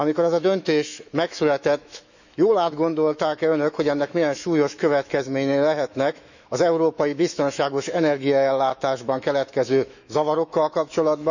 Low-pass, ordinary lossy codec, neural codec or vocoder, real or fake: 7.2 kHz; none; autoencoder, 48 kHz, 128 numbers a frame, DAC-VAE, trained on Japanese speech; fake